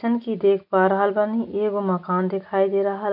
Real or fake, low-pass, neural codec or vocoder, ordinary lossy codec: real; 5.4 kHz; none; none